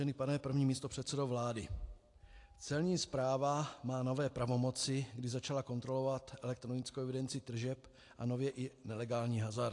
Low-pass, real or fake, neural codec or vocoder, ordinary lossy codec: 10.8 kHz; real; none; AAC, 48 kbps